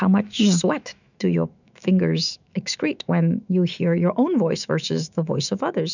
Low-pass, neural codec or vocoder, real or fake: 7.2 kHz; autoencoder, 48 kHz, 128 numbers a frame, DAC-VAE, trained on Japanese speech; fake